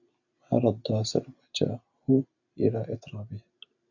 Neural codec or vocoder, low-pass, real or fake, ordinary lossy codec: none; 7.2 kHz; real; AAC, 48 kbps